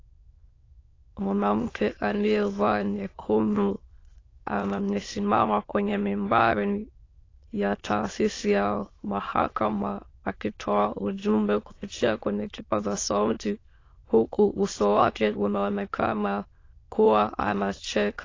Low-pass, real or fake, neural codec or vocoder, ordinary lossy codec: 7.2 kHz; fake; autoencoder, 22.05 kHz, a latent of 192 numbers a frame, VITS, trained on many speakers; AAC, 32 kbps